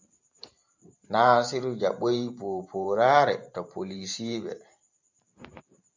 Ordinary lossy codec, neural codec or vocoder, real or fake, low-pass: MP3, 64 kbps; vocoder, 44.1 kHz, 128 mel bands every 512 samples, BigVGAN v2; fake; 7.2 kHz